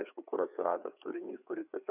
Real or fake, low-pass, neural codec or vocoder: fake; 3.6 kHz; codec, 16 kHz, 4 kbps, FreqCodec, larger model